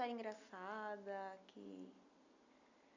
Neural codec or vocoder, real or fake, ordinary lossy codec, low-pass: none; real; none; 7.2 kHz